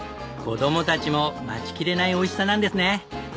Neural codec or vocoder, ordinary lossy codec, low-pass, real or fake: none; none; none; real